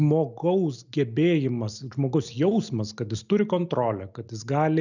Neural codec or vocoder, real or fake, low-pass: none; real; 7.2 kHz